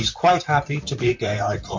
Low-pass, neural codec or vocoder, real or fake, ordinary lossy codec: 7.2 kHz; vocoder, 44.1 kHz, 128 mel bands, Pupu-Vocoder; fake; MP3, 48 kbps